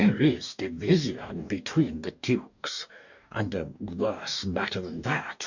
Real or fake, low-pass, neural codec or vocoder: fake; 7.2 kHz; codec, 44.1 kHz, 2.6 kbps, DAC